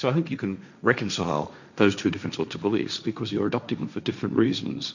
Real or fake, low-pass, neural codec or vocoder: fake; 7.2 kHz; codec, 16 kHz, 1.1 kbps, Voila-Tokenizer